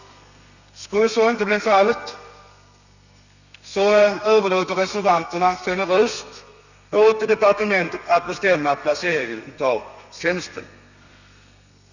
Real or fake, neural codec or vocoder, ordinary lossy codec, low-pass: fake; codec, 32 kHz, 1.9 kbps, SNAC; none; 7.2 kHz